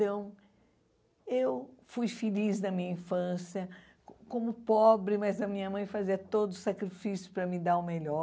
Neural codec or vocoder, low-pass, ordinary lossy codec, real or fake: none; none; none; real